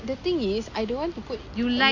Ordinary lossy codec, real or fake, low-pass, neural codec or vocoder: AAC, 48 kbps; real; 7.2 kHz; none